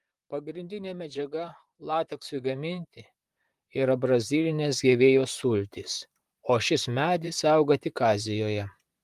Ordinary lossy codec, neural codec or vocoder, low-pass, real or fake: Opus, 32 kbps; vocoder, 44.1 kHz, 128 mel bands, Pupu-Vocoder; 14.4 kHz; fake